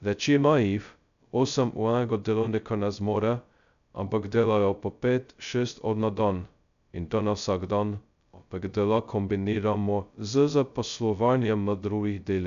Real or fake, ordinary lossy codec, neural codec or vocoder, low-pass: fake; none; codec, 16 kHz, 0.2 kbps, FocalCodec; 7.2 kHz